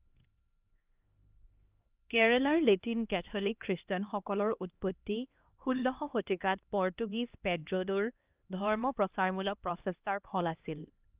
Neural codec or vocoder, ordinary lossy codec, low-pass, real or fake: codec, 16 kHz, 1 kbps, X-Codec, HuBERT features, trained on LibriSpeech; Opus, 24 kbps; 3.6 kHz; fake